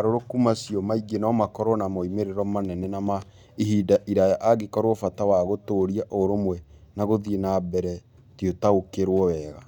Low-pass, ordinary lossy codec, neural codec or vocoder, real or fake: 19.8 kHz; none; none; real